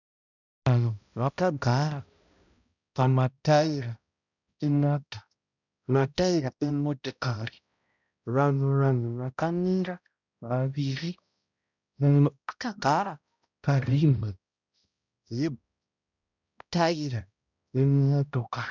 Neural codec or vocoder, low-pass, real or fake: codec, 16 kHz, 0.5 kbps, X-Codec, HuBERT features, trained on balanced general audio; 7.2 kHz; fake